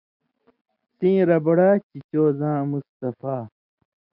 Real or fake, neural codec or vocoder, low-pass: real; none; 5.4 kHz